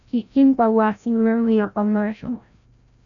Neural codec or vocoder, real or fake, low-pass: codec, 16 kHz, 0.5 kbps, FreqCodec, larger model; fake; 7.2 kHz